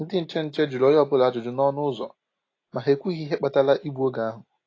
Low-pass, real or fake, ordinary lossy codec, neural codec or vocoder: 7.2 kHz; real; AAC, 32 kbps; none